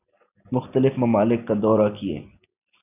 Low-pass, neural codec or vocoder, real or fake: 3.6 kHz; none; real